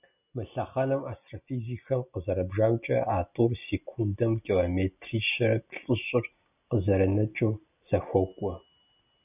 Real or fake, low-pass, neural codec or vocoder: real; 3.6 kHz; none